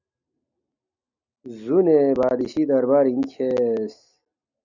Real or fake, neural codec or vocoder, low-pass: real; none; 7.2 kHz